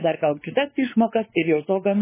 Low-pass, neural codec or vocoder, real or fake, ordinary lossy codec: 3.6 kHz; codec, 16 kHz, 4 kbps, X-Codec, WavLM features, trained on Multilingual LibriSpeech; fake; MP3, 16 kbps